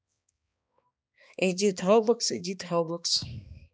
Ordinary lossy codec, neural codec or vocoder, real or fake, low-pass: none; codec, 16 kHz, 2 kbps, X-Codec, HuBERT features, trained on balanced general audio; fake; none